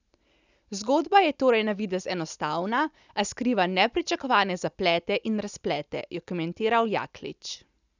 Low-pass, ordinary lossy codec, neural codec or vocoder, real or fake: 7.2 kHz; none; none; real